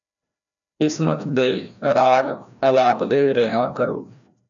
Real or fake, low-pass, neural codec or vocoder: fake; 7.2 kHz; codec, 16 kHz, 1 kbps, FreqCodec, larger model